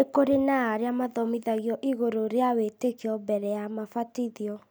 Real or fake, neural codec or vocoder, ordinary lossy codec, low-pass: real; none; none; none